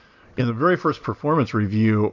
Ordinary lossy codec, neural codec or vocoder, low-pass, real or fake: AAC, 48 kbps; none; 7.2 kHz; real